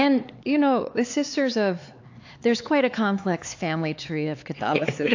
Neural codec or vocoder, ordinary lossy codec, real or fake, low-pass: codec, 16 kHz, 4 kbps, X-Codec, HuBERT features, trained on LibriSpeech; AAC, 48 kbps; fake; 7.2 kHz